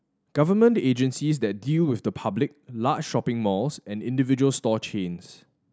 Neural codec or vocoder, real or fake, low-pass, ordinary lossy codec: none; real; none; none